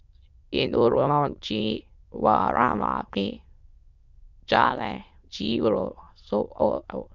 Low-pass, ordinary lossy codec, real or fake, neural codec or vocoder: 7.2 kHz; Opus, 64 kbps; fake; autoencoder, 22.05 kHz, a latent of 192 numbers a frame, VITS, trained on many speakers